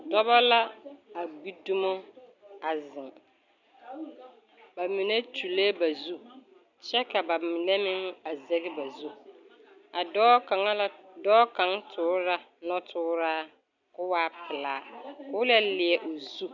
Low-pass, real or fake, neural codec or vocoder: 7.2 kHz; real; none